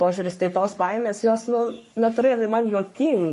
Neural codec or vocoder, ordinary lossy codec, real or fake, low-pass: codec, 44.1 kHz, 3.4 kbps, Pupu-Codec; MP3, 48 kbps; fake; 14.4 kHz